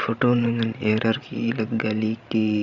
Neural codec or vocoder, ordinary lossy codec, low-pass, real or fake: codec, 16 kHz, 16 kbps, FreqCodec, larger model; none; 7.2 kHz; fake